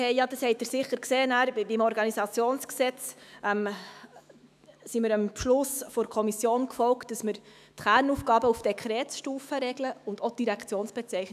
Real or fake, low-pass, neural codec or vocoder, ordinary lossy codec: fake; 14.4 kHz; autoencoder, 48 kHz, 128 numbers a frame, DAC-VAE, trained on Japanese speech; none